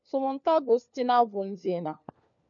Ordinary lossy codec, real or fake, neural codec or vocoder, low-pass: MP3, 64 kbps; fake; codec, 16 kHz, 4 kbps, FunCodec, trained on LibriTTS, 50 frames a second; 7.2 kHz